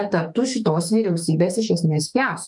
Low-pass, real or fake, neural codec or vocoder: 10.8 kHz; fake; autoencoder, 48 kHz, 32 numbers a frame, DAC-VAE, trained on Japanese speech